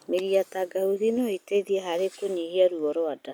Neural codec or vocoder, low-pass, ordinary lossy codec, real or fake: none; none; none; real